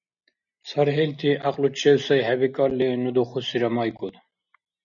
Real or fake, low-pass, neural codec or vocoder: real; 7.2 kHz; none